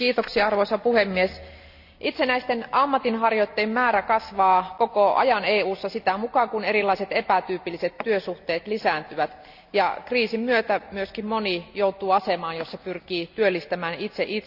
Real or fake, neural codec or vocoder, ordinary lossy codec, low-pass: real; none; none; 5.4 kHz